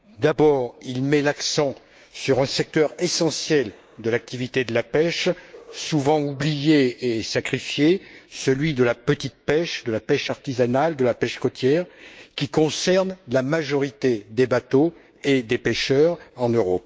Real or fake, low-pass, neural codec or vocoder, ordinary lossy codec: fake; none; codec, 16 kHz, 6 kbps, DAC; none